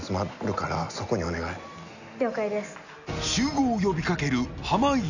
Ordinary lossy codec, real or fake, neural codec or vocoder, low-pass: none; real; none; 7.2 kHz